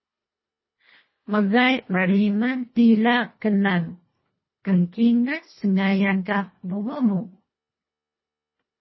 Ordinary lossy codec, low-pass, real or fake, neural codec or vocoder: MP3, 24 kbps; 7.2 kHz; fake; codec, 24 kHz, 1.5 kbps, HILCodec